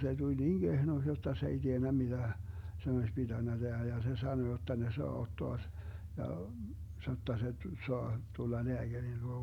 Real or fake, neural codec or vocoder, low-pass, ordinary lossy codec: real; none; 19.8 kHz; none